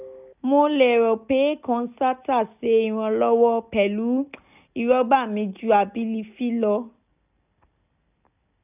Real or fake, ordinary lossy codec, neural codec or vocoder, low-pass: real; none; none; 3.6 kHz